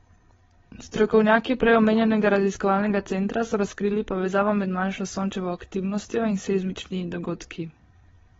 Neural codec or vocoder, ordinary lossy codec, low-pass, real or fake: codec, 16 kHz, 8 kbps, FreqCodec, larger model; AAC, 24 kbps; 7.2 kHz; fake